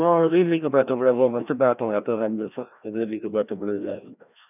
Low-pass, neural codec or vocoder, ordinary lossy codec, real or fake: 3.6 kHz; codec, 16 kHz, 1 kbps, FreqCodec, larger model; none; fake